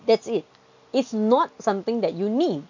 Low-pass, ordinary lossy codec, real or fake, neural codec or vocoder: 7.2 kHz; none; real; none